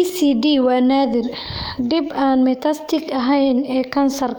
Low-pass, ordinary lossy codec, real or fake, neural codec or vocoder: none; none; fake; codec, 44.1 kHz, 7.8 kbps, DAC